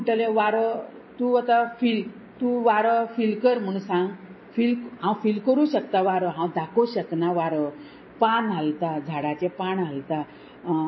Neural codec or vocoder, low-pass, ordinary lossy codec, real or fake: none; 7.2 kHz; MP3, 24 kbps; real